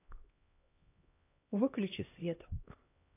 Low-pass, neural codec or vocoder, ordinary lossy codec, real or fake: 3.6 kHz; codec, 16 kHz, 1 kbps, X-Codec, HuBERT features, trained on LibriSpeech; AAC, 24 kbps; fake